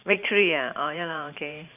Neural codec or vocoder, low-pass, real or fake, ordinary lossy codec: vocoder, 44.1 kHz, 128 mel bands every 512 samples, BigVGAN v2; 3.6 kHz; fake; none